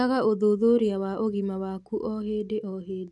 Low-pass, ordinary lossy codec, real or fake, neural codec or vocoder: none; none; real; none